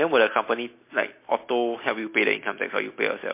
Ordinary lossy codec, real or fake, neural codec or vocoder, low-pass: MP3, 24 kbps; real; none; 3.6 kHz